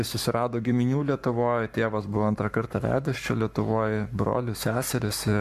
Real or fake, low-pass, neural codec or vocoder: fake; 14.4 kHz; codec, 44.1 kHz, 7.8 kbps, Pupu-Codec